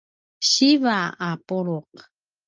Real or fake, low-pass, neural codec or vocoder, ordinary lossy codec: real; 7.2 kHz; none; Opus, 32 kbps